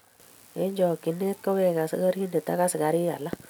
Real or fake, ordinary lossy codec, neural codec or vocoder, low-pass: real; none; none; none